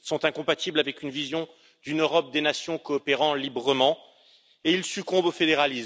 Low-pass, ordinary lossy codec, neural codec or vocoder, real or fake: none; none; none; real